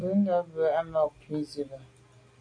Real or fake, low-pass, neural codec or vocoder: real; 9.9 kHz; none